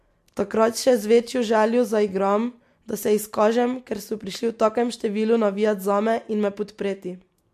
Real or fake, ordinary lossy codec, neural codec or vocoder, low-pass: real; MP3, 64 kbps; none; 14.4 kHz